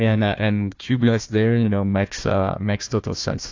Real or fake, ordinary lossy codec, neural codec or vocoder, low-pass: fake; AAC, 48 kbps; codec, 16 kHz, 1 kbps, FunCodec, trained on Chinese and English, 50 frames a second; 7.2 kHz